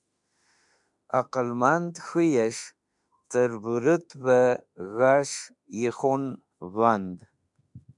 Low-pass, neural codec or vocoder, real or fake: 10.8 kHz; autoencoder, 48 kHz, 32 numbers a frame, DAC-VAE, trained on Japanese speech; fake